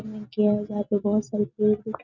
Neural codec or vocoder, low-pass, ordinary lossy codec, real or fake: none; 7.2 kHz; none; real